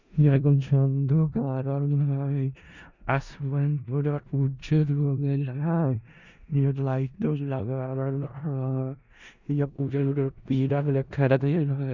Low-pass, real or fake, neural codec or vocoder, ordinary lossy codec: 7.2 kHz; fake; codec, 16 kHz in and 24 kHz out, 0.4 kbps, LongCat-Audio-Codec, four codebook decoder; Opus, 64 kbps